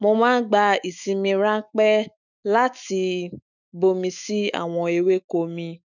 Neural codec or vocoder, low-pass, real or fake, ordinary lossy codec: autoencoder, 48 kHz, 128 numbers a frame, DAC-VAE, trained on Japanese speech; 7.2 kHz; fake; none